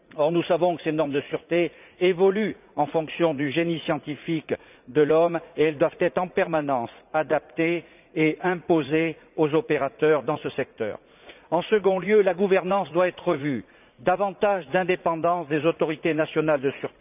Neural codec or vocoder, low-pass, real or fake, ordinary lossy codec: vocoder, 44.1 kHz, 80 mel bands, Vocos; 3.6 kHz; fake; none